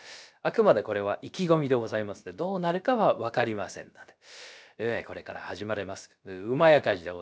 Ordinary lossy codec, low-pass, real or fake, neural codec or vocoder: none; none; fake; codec, 16 kHz, about 1 kbps, DyCAST, with the encoder's durations